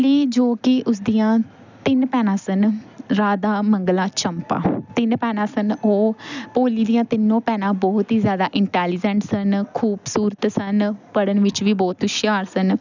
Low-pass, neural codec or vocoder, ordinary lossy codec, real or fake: 7.2 kHz; none; none; real